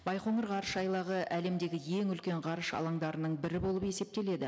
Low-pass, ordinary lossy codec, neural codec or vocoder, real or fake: none; none; none; real